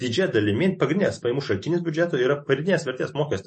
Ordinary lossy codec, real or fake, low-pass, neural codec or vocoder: MP3, 32 kbps; real; 10.8 kHz; none